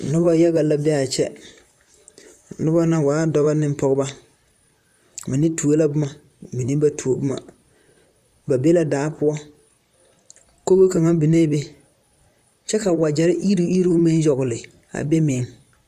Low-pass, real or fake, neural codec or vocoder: 14.4 kHz; fake; vocoder, 44.1 kHz, 128 mel bands, Pupu-Vocoder